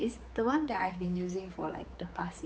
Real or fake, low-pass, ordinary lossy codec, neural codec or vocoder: fake; none; none; codec, 16 kHz, 4 kbps, X-Codec, HuBERT features, trained on general audio